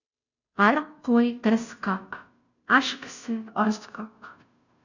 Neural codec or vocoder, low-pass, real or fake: codec, 16 kHz, 0.5 kbps, FunCodec, trained on Chinese and English, 25 frames a second; 7.2 kHz; fake